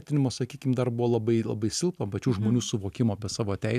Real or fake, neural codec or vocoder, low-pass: real; none; 14.4 kHz